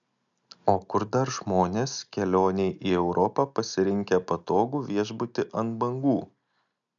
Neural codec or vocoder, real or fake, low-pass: none; real; 7.2 kHz